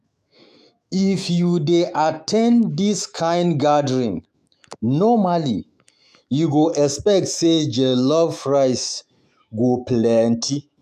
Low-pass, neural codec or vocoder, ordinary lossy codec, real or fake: 14.4 kHz; autoencoder, 48 kHz, 128 numbers a frame, DAC-VAE, trained on Japanese speech; none; fake